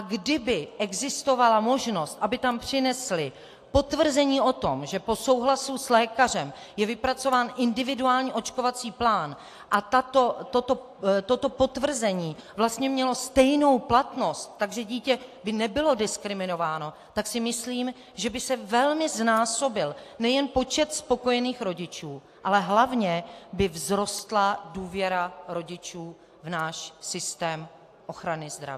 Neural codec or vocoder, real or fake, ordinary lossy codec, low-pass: none; real; AAC, 64 kbps; 14.4 kHz